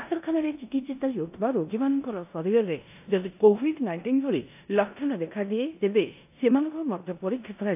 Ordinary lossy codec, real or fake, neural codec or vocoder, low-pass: AAC, 32 kbps; fake; codec, 16 kHz in and 24 kHz out, 0.9 kbps, LongCat-Audio-Codec, four codebook decoder; 3.6 kHz